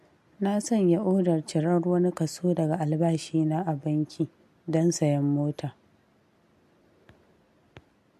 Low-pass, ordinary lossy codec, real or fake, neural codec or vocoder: 14.4 kHz; MP3, 64 kbps; real; none